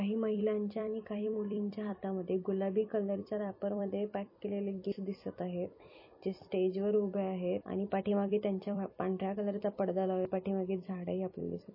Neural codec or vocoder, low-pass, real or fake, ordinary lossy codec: vocoder, 44.1 kHz, 128 mel bands every 256 samples, BigVGAN v2; 5.4 kHz; fake; MP3, 24 kbps